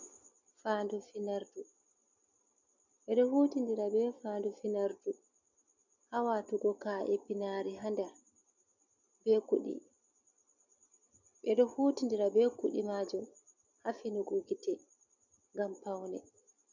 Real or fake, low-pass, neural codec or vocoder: real; 7.2 kHz; none